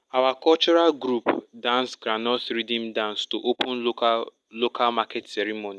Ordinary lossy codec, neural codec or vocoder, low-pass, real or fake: none; none; none; real